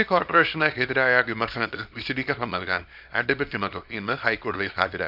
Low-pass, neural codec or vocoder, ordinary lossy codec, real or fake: 5.4 kHz; codec, 24 kHz, 0.9 kbps, WavTokenizer, small release; none; fake